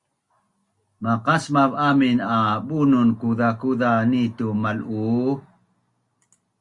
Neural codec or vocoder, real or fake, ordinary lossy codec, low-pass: none; real; Opus, 64 kbps; 10.8 kHz